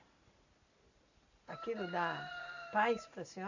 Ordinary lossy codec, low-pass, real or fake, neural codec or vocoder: none; 7.2 kHz; real; none